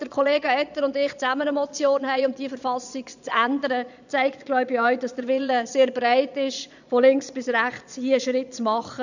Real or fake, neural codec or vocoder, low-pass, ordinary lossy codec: fake; vocoder, 22.05 kHz, 80 mel bands, Vocos; 7.2 kHz; none